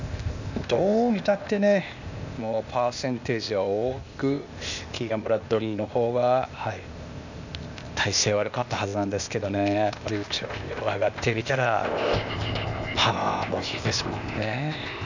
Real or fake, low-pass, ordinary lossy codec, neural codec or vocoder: fake; 7.2 kHz; none; codec, 16 kHz, 0.8 kbps, ZipCodec